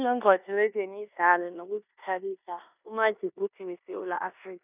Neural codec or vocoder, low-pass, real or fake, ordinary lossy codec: codec, 16 kHz in and 24 kHz out, 0.9 kbps, LongCat-Audio-Codec, four codebook decoder; 3.6 kHz; fake; none